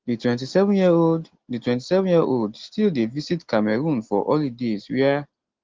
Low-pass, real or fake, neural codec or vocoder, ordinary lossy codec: 7.2 kHz; real; none; Opus, 16 kbps